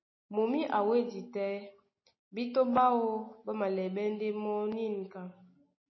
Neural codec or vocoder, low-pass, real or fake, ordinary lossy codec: none; 7.2 kHz; real; MP3, 24 kbps